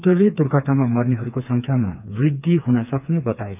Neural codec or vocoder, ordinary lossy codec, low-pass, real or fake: codec, 16 kHz, 4 kbps, FreqCodec, smaller model; none; 3.6 kHz; fake